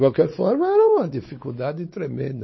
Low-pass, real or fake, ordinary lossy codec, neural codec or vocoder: 7.2 kHz; fake; MP3, 24 kbps; codec, 16 kHz, 4 kbps, X-Codec, WavLM features, trained on Multilingual LibriSpeech